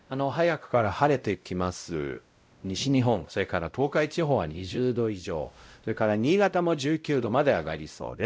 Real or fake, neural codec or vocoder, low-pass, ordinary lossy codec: fake; codec, 16 kHz, 0.5 kbps, X-Codec, WavLM features, trained on Multilingual LibriSpeech; none; none